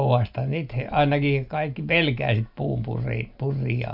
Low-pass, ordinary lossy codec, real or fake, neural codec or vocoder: 5.4 kHz; AAC, 48 kbps; real; none